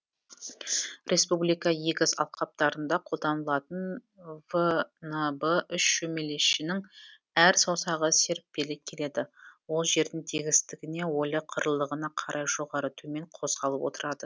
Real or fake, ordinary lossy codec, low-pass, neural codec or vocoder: real; none; none; none